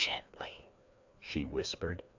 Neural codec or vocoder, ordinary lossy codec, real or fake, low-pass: codec, 16 kHz, 2 kbps, FreqCodec, larger model; AAC, 48 kbps; fake; 7.2 kHz